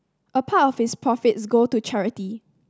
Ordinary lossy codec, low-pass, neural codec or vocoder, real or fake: none; none; none; real